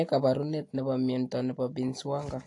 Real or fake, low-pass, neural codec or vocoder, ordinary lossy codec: real; 10.8 kHz; none; AAC, 48 kbps